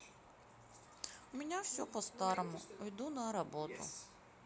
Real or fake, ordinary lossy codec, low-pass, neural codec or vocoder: real; none; none; none